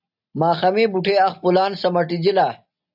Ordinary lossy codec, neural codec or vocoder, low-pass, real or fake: AAC, 48 kbps; none; 5.4 kHz; real